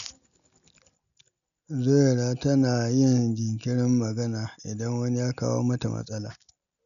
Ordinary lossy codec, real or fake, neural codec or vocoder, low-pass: none; real; none; 7.2 kHz